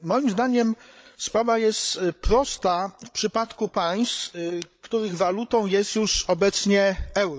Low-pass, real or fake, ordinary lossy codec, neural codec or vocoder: none; fake; none; codec, 16 kHz, 8 kbps, FreqCodec, larger model